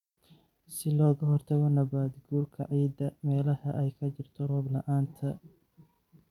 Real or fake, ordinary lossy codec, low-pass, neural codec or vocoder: fake; none; 19.8 kHz; vocoder, 44.1 kHz, 128 mel bands every 256 samples, BigVGAN v2